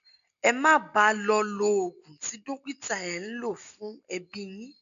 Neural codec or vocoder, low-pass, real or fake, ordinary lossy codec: none; 7.2 kHz; real; none